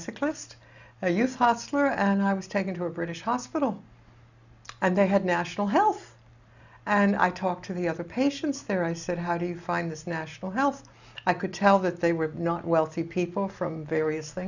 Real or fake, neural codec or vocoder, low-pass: real; none; 7.2 kHz